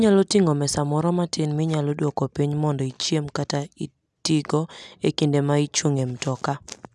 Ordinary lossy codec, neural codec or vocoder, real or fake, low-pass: none; none; real; none